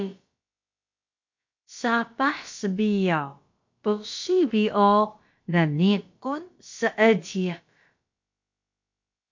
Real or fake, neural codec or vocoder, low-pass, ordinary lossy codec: fake; codec, 16 kHz, about 1 kbps, DyCAST, with the encoder's durations; 7.2 kHz; MP3, 48 kbps